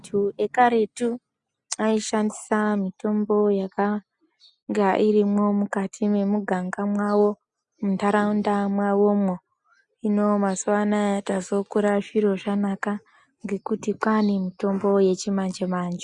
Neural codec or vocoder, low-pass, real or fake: none; 10.8 kHz; real